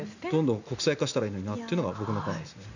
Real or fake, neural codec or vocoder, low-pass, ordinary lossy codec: real; none; 7.2 kHz; none